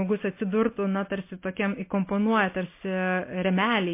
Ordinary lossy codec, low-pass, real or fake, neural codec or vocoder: MP3, 24 kbps; 3.6 kHz; real; none